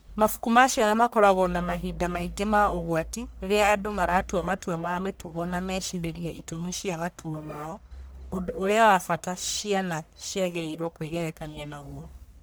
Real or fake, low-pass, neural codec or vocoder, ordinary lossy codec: fake; none; codec, 44.1 kHz, 1.7 kbps, Pupu-Codec; none